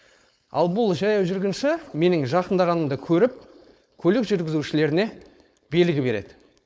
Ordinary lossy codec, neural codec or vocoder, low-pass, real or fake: none; codec, 16 kHz, 4.8 kbps, FACodec; none; fake